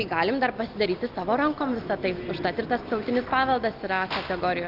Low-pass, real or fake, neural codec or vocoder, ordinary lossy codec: 5.4 kHz; real; none; Opus, 32 kbps